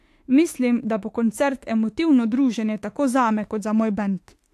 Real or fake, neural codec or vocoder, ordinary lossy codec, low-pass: fake; autoencoder, 48 kHz, 32 numbers a frame, DAC-VAE, trained on Japanese speech; AAC, 64 kbps; 14.4 kHz